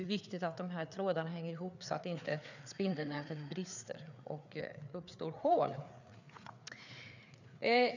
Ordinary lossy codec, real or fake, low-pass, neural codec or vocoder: none; fake; 7.2 kHz; codec, 16 kHz, 4 kbps, FreqCodec, larger model